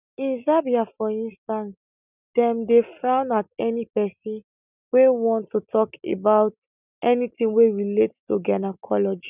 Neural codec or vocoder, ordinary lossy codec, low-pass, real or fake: none; none; 3.6 kHz; real